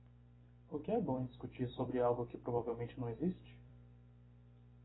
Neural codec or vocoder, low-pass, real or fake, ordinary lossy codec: vocoder, 44.1 kHz, 128 mel bands every 256 samples, BigVGAN v2; 7.2 kHz; fake; AAC, 16 kbps